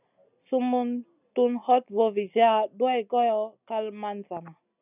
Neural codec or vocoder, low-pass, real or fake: none; 3.6 kHz; real